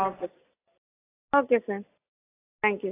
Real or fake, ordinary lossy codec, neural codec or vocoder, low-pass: real; AAC, 16 kbps; none; 3.6 kHz